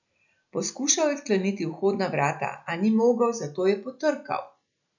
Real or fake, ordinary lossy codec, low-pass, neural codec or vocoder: real; none; 7.2 kHz; none